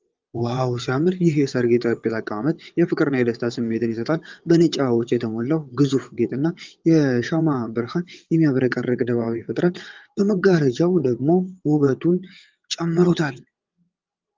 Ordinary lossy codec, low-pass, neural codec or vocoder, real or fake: Opus, 32 kbps; 7.2 kHz; vocoder, 22.05 kHz, 80 mel bands, WaveNeXt; fake